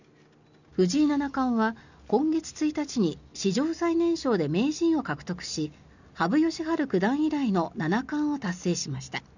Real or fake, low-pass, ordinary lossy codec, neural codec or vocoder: real; 7.2 kHz; none; none